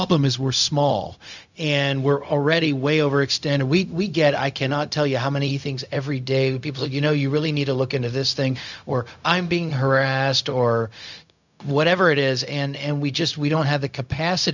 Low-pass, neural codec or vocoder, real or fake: 7.2 kHz; codec, 16 kHz, 0.4 kbps, LongCat-Audio-Codec; fake